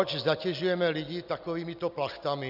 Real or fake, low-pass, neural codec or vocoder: real; 5.4 kHz; none